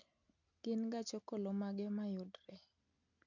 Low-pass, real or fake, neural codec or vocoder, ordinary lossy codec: 7.2 kHz; real; none; none